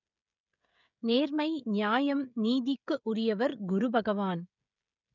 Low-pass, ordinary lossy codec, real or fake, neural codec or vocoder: 7.2 kHz; none; fake; codec, 16 kHz, 16 kbps, FreqCodec, smaller model